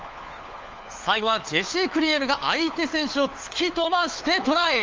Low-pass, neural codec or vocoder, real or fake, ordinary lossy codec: 7.2 kHz; codec, 16 kHz, 4 kbps, FunCodec, trained on LibriTTS, 50 frames a second; fake; Opus, 32 kbps